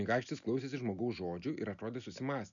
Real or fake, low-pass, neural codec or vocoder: real; 7.2 kHz; none